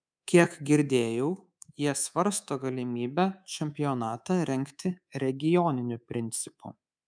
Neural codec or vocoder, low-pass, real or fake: codec, 24 kHz, 3.1 kbps, DualCodec; 9.9 kHz; fake